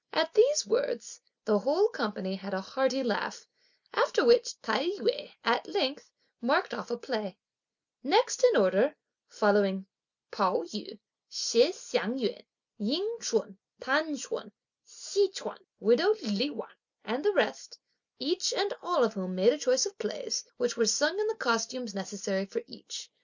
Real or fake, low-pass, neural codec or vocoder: real; 7.2 kHz; none